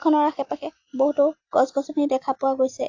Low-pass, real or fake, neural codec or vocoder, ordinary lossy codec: 7.2 kHz; real; none; AAC, 48 kbps